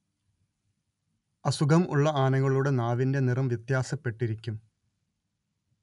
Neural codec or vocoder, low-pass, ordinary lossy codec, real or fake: none; 10.8 kHz; none; real